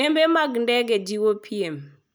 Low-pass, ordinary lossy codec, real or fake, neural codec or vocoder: none; none; real; none